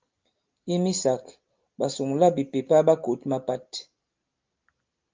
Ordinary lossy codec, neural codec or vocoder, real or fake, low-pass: Opus, 32 kbps; none; real; 7.2 kHz